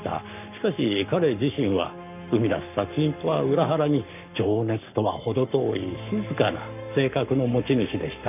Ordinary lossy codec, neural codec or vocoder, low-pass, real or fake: none; codec, 44.1 kHz, 7.8 kbps, Pupu-Codec; 3.6 kHz; fake